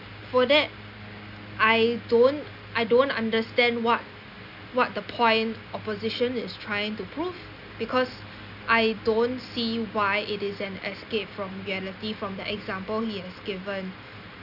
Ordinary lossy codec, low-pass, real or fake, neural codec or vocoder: none; 5.4 kHz; real; none